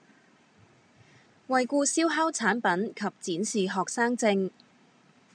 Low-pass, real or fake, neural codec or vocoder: 9.9 kHz; real; none